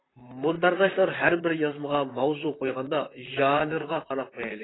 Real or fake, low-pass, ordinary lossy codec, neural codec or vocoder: fake; 7.2 kHz; AAC, 16 kbps; vocoder, 44.1 kHz, 128 mel bands, Pupu-Vocoder